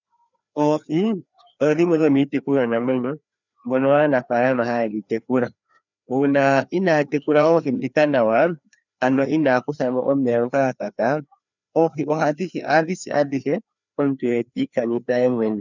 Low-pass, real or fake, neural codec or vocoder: 7.2 kHz; fake; codec, 16 kHz, 2 kbps, FreqCodec, larger model